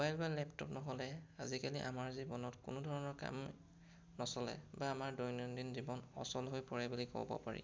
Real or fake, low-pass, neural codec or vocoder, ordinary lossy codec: real; none; none; none